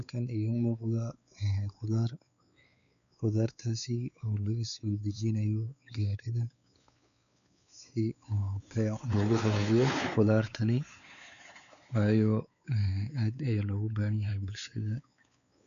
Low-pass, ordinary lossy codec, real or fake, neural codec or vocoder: 7.2 kHz; MP3, 96 kbps; fake; codec, 16 kHz, 4 kbps, X-Codec, WavLM features, trained on Multilingual LibriSpeech